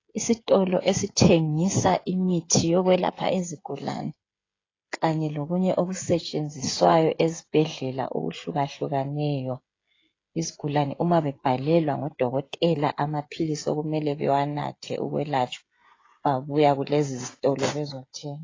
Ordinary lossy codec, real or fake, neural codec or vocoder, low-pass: AAC, 32 kbps; fake; codec, 16 kHz, 16 kbps, FreqCodec, smaller model; 7.2 kHz